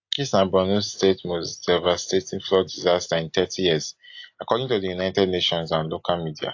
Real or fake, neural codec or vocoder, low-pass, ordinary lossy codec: real; none; 7.2 kHz; AAC, 48 kbps